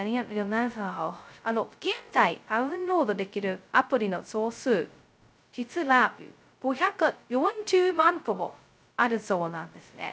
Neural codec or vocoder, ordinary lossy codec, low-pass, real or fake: codec, 16 kHz, 0.2 kbps, FocalCodec; none; none; fake